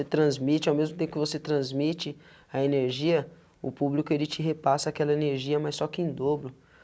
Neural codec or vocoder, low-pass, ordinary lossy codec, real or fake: none; none; none; real